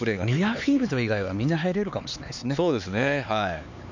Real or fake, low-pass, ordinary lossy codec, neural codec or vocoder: fake; 7.2 kHz; none; codec, 16 kHz, 4 kbps, X-Codec, HuBERT features, trained on LibriSpeech